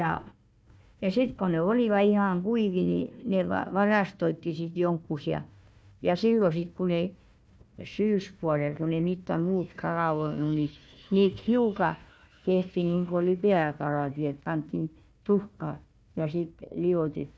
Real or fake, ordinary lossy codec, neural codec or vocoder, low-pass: fake; none; codec, 16 kHz, 1 kbps, FunCodec, trained on Chinese and English, 50 frames a second; none